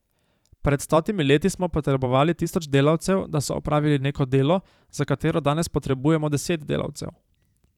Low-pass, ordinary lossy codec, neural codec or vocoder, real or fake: 19.8 kHz; none; vocoder, 44.1 kHz, 128 mel bands every 512 samples, BigVGAN v2; fake